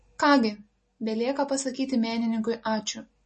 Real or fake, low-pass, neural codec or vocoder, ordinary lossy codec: real; 10.8 kHz; none; MP3, 32 kbps